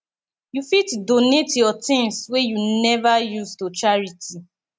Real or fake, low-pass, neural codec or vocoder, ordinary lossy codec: real; none; none; none